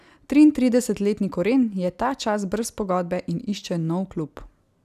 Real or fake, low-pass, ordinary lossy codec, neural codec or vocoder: real; 14.4 kHz; none; none